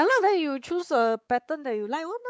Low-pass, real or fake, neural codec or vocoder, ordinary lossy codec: none; fake; codec, 16 kHz, 4 kbps, X-Codec, WavLM features, trained on Multilingual LibriSpeech; none